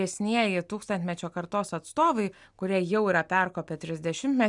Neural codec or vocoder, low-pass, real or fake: none; 10.8 kHz; real